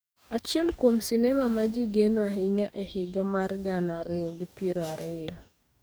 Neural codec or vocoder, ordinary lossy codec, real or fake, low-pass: codec, 44.1 kHz, 2.6 kbps, DAC; none; fake; none